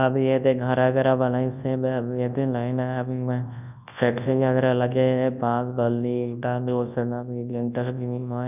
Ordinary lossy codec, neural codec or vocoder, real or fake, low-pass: none; codec, 24 kHz, 0.9 kbps, WavTokenizer, large speech release; fake; 3.6 kHz